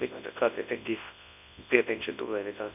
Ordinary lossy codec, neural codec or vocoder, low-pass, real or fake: none; codec, 24 kHz, 0.9 kbps, WavTokenizer, large speech release; 3.6 kHz; fake